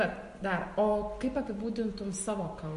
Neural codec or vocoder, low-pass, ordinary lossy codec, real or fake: none; 14.4 kHz; MP3, 48 kbps; real